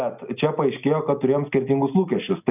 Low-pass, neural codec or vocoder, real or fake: 3.6 kHz; none; real